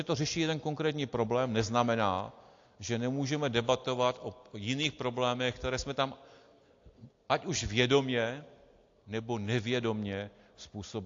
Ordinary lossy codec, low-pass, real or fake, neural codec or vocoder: AAC, 48 kbps; 7.2 kHz; real; none